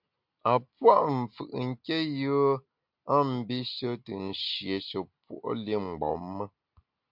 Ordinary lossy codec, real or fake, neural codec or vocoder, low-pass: MP3, 48 kbps; real; none; 5.4 kHz